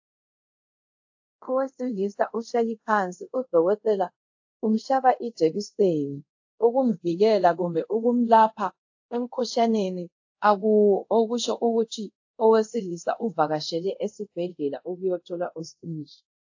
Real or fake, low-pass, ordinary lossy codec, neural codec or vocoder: fake; 7.2 kHz; AAC, 48 kbps; codec, 24 kHz, 0.5 kbps, DualCodec